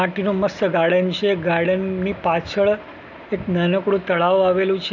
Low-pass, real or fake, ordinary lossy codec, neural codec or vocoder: 7.2 kHz; real; none; none